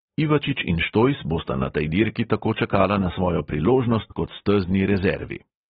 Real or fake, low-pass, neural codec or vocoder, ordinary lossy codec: real; 14.4 kHz; none; AAC, 16 kbps